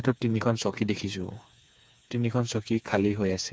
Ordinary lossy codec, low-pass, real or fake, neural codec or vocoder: none; none; fake; codec, 16 kHz, 4 kbps, FreqCodec, smaller model